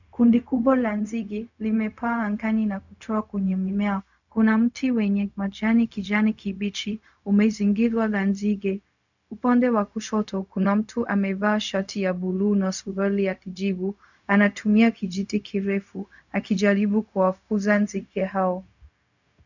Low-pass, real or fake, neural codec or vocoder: 7.2 kHz; fake; codec, 16 kHz, 0.4 kbps, LongCat-Audio-Codec